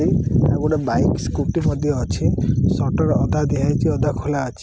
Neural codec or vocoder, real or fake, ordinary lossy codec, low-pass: none; real; none; none